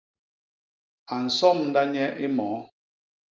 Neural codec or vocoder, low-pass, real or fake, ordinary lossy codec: none; 7.2 kHz; real; Opus, 24 kbps